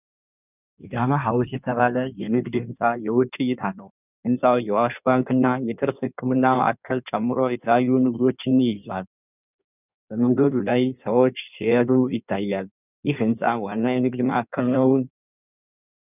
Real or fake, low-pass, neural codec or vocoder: fake; 3.6 kHz; codec, 16 kHz in and 24 kHz out, 1.1 kbps, FireRedTTS-2 codec